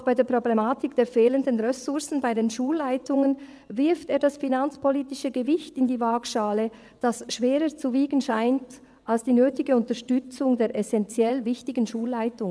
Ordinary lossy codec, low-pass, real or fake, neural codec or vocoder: none; none; fake; vocoder, 22.05 kHz, 80 mel bands, WaveNeXt